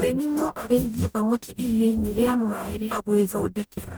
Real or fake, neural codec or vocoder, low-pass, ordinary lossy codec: fake; codec, 44.1 kHz, 0.9 kbps, DAC; none; none